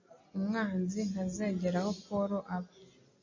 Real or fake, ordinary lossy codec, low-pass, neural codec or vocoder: real; MP3, 32 kbps; 7.2 kHz; none